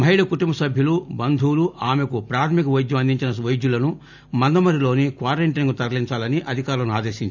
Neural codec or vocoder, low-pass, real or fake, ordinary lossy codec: none; 7.2 kHz; real; none